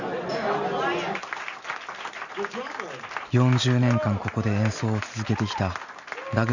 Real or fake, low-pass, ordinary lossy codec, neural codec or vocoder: real; 7.2 kHz; none; none